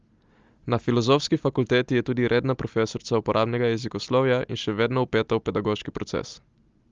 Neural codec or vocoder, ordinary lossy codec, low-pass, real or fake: none; Opus, 32 kbps; 7.2 kHz; real